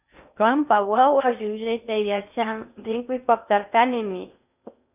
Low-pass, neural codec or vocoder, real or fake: 3.6 kHz; codec, 16 kHz in and 24 kHz out, 0.6 kbps, FocalCodec, streaming, 2048 codes; fake